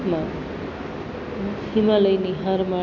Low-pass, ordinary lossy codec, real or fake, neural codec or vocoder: 7.2 kHz; none; real; none